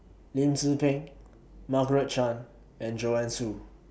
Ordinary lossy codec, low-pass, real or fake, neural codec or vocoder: none; none; real; none